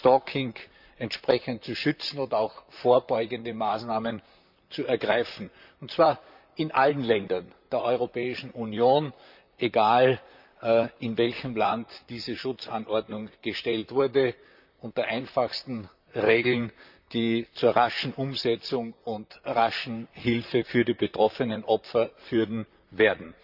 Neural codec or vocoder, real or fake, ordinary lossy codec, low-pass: vocoder, 44.1 kHz, 128 mel bands, Pupu-Vocoder; fake; none; 5.4 kHz